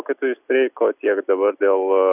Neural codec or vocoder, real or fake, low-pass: none; real; 3.6 kHz